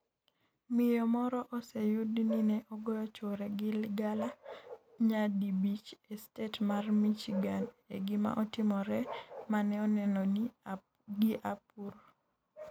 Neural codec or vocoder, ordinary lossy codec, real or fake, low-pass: none; none; real; 19.8 kHz